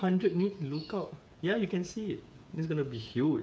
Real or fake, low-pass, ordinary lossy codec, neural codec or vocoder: fake; none; none; codec, 16 kHz, 8 kbps, FreqCodec, smaller model